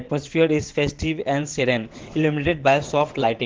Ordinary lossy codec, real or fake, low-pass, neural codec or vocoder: Opus, 16 kbps; fake; 7.2 kHz; vocoder, 22.05 kHz, 80 mel bands, WaveNeXt